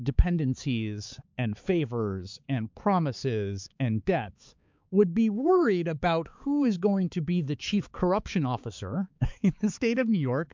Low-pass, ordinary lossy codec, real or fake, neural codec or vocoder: 7.2 kHz; MP3, 64 kbps; fake; codec, 16 kHz, 4 kbps, X-Codec, HuBERT features, trained on balanced general audio